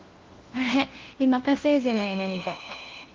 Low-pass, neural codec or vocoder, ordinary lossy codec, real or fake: 7.2 kHz; codec, 16 kHz, 1 kbps, FunCodec, trained on LibriTTS, 50 frames a second; Opus, 16 kbps; fake